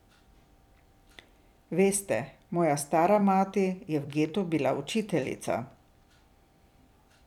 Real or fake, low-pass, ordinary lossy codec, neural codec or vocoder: fake; 19.8 kHz; none; vocoder, 44.1 kHz, 128 mel bands every 256 samples, BigVGAN v2